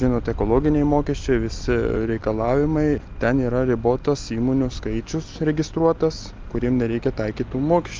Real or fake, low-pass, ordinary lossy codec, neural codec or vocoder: real; 7.2 kHz; Opus, 32 kbps; none